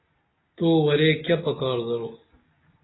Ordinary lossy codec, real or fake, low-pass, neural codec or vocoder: AAC, 16 kbps; real; 7.2 kHz; none